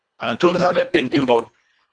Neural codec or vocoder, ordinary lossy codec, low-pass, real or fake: codec, 24 kHz, 1.5 kbps, HILCodec; AAC, 64 kbps; 9.9 kHz; fake